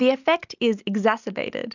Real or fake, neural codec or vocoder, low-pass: real; none; 7.2 kHz